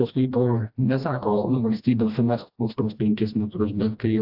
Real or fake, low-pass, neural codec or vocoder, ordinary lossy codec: fake; 5.4 kHz; codec, 16 kHz, 1 kbps, FreqCodec, smaller model; AAC, 48 kbps